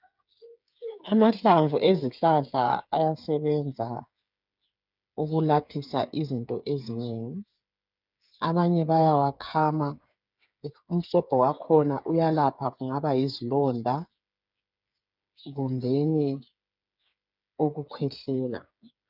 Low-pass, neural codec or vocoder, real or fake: 5.4 kHz; codec, 16 kHz, 8 kbps, FreqCodec, smaller model; fake